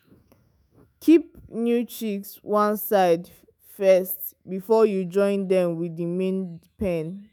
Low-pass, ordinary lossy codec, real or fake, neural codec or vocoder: none; none; fake; autoencoder, 48 kHz, 128 numbers a frame, DAC-VAE, trained on Japanese speech